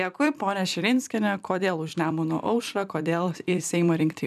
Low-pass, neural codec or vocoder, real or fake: 14.4 kHz; vocoder, 44.1 kHz, 128 mel bands every 256 samples, BigVGAN v2; fake